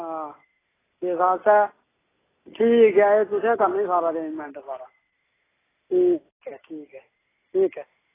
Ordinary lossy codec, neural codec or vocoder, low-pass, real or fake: AAC, 16 kbps; none; 3.6 kHz; real